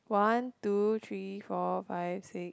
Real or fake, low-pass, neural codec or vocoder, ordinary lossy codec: real; none; none; none